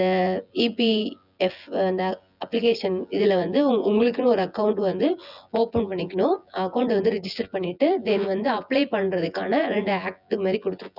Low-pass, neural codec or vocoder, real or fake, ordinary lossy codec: 5.4 kHz; vocoder, 24 kHz, 100 mel bands, Vocos; fake; none